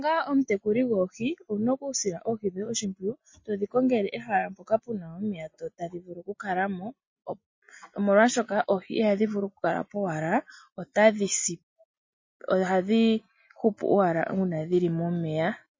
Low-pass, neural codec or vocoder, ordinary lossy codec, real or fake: 7.2 kHz; none; MP3, 32 kbps; real